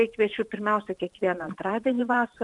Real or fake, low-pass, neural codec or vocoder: fake; 10.8 kHz; vocoder, 44.1 kHz, 128 mel bands every 512 samples, BigVGAN v2